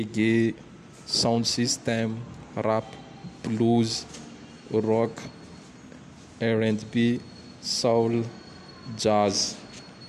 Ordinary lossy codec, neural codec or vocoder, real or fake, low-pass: none; none; real; 14.4 kHz